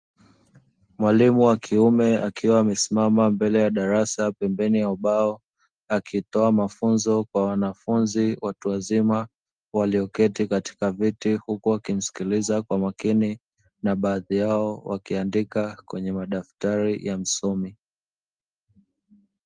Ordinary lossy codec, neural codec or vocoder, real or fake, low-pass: Opus, 24 kbps; none; real; 9.9 kHz